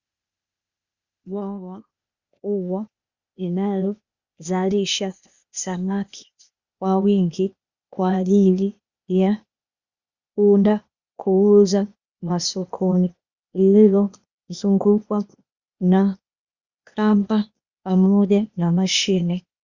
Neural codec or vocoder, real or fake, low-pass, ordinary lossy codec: codec, 16 kHz, 0.8 kbps, ZipCodec; fake; 7.2 kHz; Opus, 64 kbps